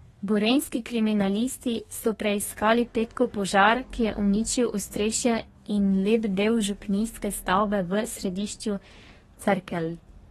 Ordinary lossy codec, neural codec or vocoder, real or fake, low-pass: AAC, 32 kbps; codec, 32 kHz, 1.9 kbps, SNAC; fake; 14.4 kHz